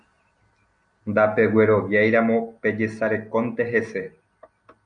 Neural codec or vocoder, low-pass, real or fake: none; 9.9 kHz; real